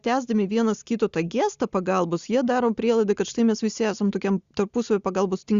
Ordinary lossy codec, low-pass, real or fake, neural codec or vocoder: Opus, 64 kbps; 7.2 kHz; real; none